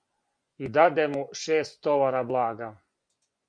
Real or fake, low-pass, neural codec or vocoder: fake; 9.9 kHz; vocoder, 24 kHz, 100 mel bands, Vocos